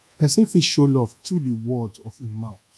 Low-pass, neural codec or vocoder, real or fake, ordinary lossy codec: none; codec, 24 kHz, 1.2 kbps, DualCodec; fake; none